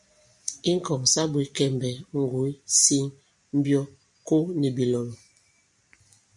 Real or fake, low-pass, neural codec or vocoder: real; 10.8 kHz; none